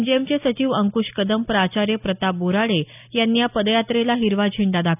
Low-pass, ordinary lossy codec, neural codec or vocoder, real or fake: 3.6 kHz; none; none; real